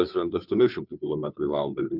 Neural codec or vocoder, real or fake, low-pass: codec, 16 kHz, 2 kbps, FunCodec, trained on Chinese and English, 25 frames a second; fake; 5.4 kHz